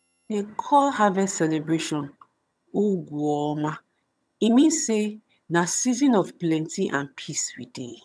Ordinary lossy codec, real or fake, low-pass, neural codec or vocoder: none; fake; none; vocoder, 22.05 kHz, 80 mel bands, HiFi-GAN